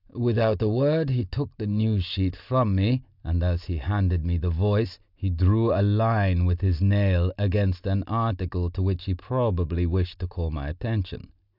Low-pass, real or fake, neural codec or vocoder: 5.4 kHz; real; none